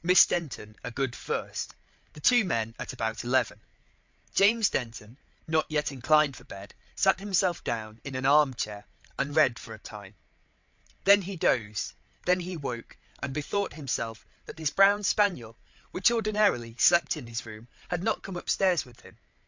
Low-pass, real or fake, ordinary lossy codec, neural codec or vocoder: 7.2 kHz; fake; MP3, 64 kbps; codec, 16 kHz, 8 kbps, FreqCodec, larger model